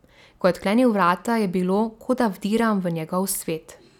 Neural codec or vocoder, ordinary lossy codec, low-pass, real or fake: none; none; 19.8 kHz; real